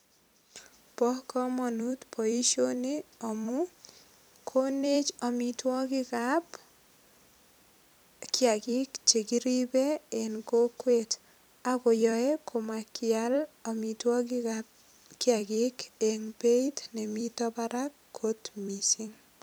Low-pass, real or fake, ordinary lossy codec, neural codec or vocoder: none; fake; none; vocoder, 44.1 kHz, 128 mel bands every 256 samples, BigVGAN v2